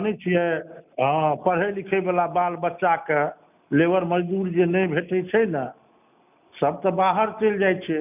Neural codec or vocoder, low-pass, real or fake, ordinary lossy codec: none; 3.6 kHz; real; none